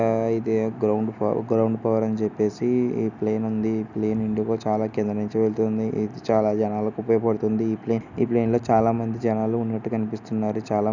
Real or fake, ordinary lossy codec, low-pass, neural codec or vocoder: real; none; 7.2 kHz; none